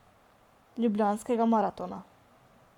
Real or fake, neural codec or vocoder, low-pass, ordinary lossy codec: fake; codec, 44.1 kHz, 7.8 kbps, Pupu-Codec; 19.8 kHz; none